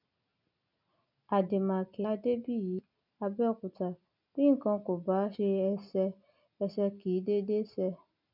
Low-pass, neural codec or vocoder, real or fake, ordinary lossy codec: 5.4 kHz; none; real; none